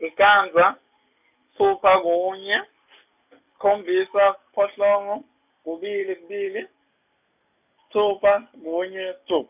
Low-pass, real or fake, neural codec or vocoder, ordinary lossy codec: 3.6 kHz; real; none; none